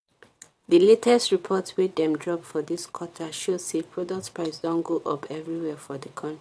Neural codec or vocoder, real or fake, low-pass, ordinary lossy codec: vocoder, 22.05 kHz, 80 mel bands, WaveNeXt; fake; none; none